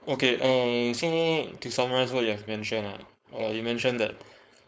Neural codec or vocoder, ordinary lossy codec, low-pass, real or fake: codec, 16 kHz, 4.8 kbps, FACodec; none; none; fake